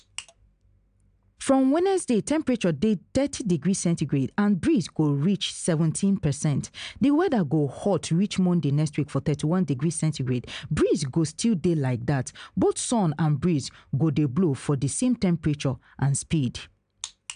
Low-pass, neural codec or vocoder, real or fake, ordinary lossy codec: 9.9 kHz; none; real; AAC, 96 kbps